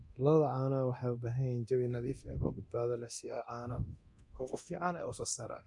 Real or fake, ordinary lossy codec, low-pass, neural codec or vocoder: fake; MP3, 64 kbps; 10.8 kHz; codec, 24 kHz, 0.9 kbps, DualCodec